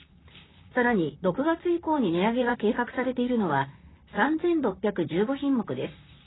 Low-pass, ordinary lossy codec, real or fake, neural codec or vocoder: 7.2 kHz; AAC, 16 kbps; fake; vocoder, 44.1 kHz, 128 mel bands, Pupu-Vocoder